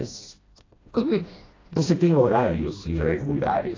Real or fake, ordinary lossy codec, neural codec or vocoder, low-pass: fake; AAC, 32 kbps; codec, 16 kHz, 1 kbps, FreqCodec, smaller model; 7.2 kHz